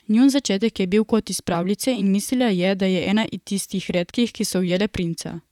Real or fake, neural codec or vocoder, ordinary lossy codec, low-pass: fake; vocoder, 44.1 kHz, 128 mel bands, Pupu-Vocoder; none; 19.8 kHz